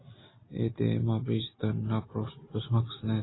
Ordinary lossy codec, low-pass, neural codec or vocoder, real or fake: AAC, 16 kbps; 7.2 kHz; none; real